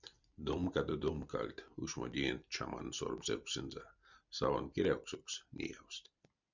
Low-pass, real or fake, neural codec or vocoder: 7.2 kHz; fake; vocoder, 44.1 kHz, 128 mel bands every 256 samples, BigVGAN v2